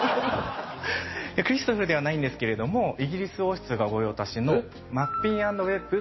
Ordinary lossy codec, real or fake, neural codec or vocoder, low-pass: MP3, 24 kbps; real; none; 7.2 kHz